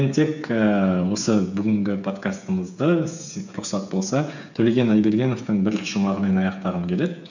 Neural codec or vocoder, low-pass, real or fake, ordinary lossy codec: codec, 16 kHz, 8 kbps, FreqCodec, smaller model; 7.2 kHz; fake; none